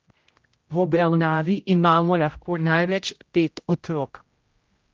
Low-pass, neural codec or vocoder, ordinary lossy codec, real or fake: 7.2 kHz; codec, 16 kHz, 0.5 kbps, X-Codec, HuBERT features, trained on general audio; Opus, 32 kbps; fake